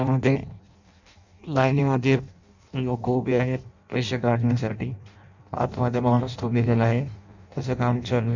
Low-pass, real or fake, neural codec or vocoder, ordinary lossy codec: 7.2 kHz; fake; codec, 16 kHz in and 24 kHz out, 0.6 kbps, FireRedTTS-2 codec; none